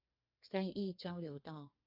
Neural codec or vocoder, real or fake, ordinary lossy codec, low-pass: codec, 32 kHz, 1.9 kbps, SNAC; fake; AAC, 48 kbps; 5.4 kHz